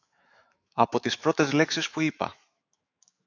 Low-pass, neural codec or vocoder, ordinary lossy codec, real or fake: 7.2 kHz; autoencoder, 48 kHz, 128 numbers a frame, DAC-VAE, trained on Japanese speech; AAC, 48 kbps; fake